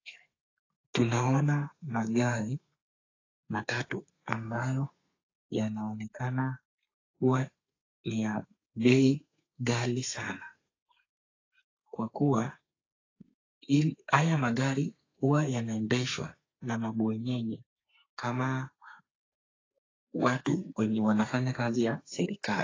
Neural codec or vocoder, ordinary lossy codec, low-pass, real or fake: codec, 32 kHz, 1.9 kbps, SNAC; AAC, 32 kbps; 7.2 kHz; fake